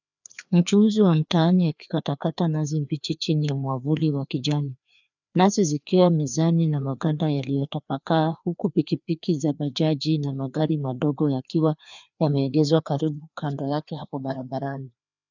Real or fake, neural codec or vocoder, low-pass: fake; codec, 16 kHz, 2 kbps, FreqCodec, larger model; 7.2 kHz